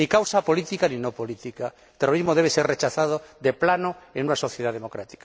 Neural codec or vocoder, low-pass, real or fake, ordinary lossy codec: none; none; real; none